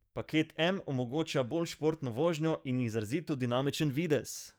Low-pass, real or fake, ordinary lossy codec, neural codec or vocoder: none; fake; none; codec, 44.1 kHz, 7.8 kbps, DAC